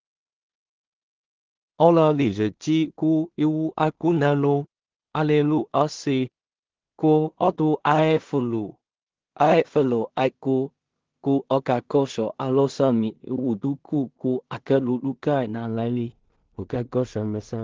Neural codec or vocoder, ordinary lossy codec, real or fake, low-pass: codec, 16 kHz in and 24 kHz out, 0.4 kbps, LongCat-Audio-Codec, two codebook decoder; Opus, 16 kbps; fake; 7.2 kHz